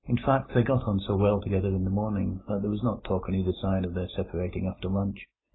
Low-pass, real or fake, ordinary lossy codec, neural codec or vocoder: 7.2 kHz; real; AAC, 16 kbps; none